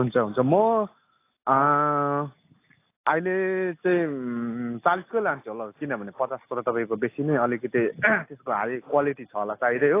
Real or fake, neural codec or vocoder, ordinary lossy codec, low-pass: real; none; AAC, 24 kbps; 3.6 kHz